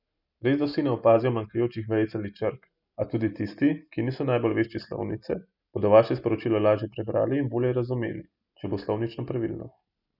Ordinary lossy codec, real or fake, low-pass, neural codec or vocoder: none; real; 5.4 kHz; none